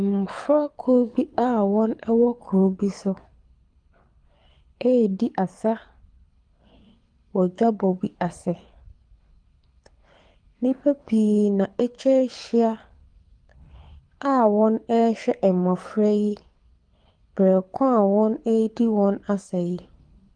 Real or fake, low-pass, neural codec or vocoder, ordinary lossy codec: fake; 9.9 kHz; codec, 24 kHz, 6 kbps, HILCodec; Opus, 32 kbps